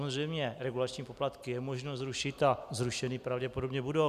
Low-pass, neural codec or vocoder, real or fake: 14.4 kHz; none; real